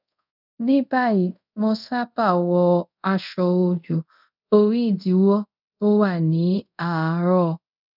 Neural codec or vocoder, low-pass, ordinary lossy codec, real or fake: codec, 24 kHz, 0.5 kbps, DualCodec; 5.4 kHz; none; fake